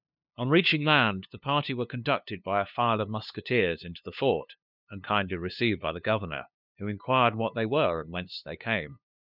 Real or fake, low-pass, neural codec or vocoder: fake; 5.4 kHz; codec, 16 kHz, 2 kbps, FunCodec, trained on LibriTTS, 25 frames a second